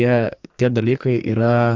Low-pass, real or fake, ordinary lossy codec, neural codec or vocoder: 7.2 kHz; fake; AAC, 48 kbps; codec, 44.1 kHz, 2.6 kbps, SNAC